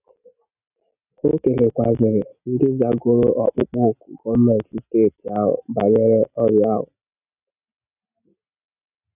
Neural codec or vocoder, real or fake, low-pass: none; real; 3.6 kHz